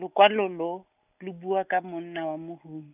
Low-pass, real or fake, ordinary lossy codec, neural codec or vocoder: 3.6 kHz; real; none; none